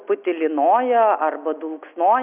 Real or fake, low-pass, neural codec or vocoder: real; 3.6 kHz; none